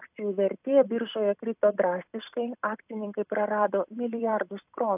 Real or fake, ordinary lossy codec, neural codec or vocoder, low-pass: fake; AAC, 32 kbps; vocoder, 44.1 kHz, 128 mel bands every 256 samples, BigVGAN v2; 3.6 kHz